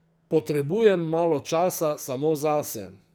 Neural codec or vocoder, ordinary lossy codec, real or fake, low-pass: codec, 44.1 kHz, 2.6 kbps, SNAC; none; fake; none